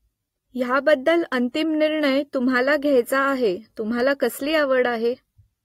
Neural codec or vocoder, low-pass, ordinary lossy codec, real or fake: none; 19.8 kHz; AAC, 48 kbps; real